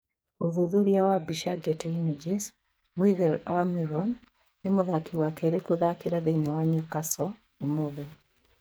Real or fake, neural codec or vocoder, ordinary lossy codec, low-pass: fake; codec, 44.1 kHz, 2.6 kbps, SNAC; none; none